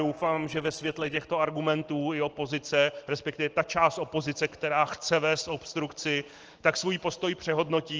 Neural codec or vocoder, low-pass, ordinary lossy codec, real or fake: none; 7.2 kHz; Opus, 16 kbps; real